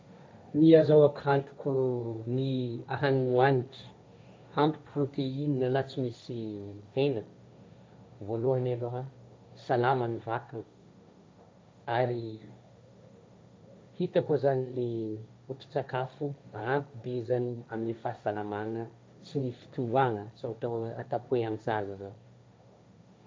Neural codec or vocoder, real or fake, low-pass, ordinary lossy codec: codec, 16 kHz, 1.1 kbps, Voila-Tokenizer; fake; none; none